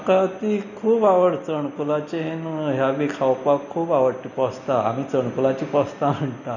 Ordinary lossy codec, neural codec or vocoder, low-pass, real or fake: none; none; 7.2 kHz; real